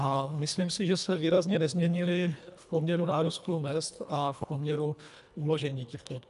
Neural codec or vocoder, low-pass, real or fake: codec, 24 kHz, 1.5 kbps, HILCodec; 10.8 kHz; fake